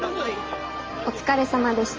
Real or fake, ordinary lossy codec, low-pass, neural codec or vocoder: real; Opus, 24 kbps; 7.2 kHz; none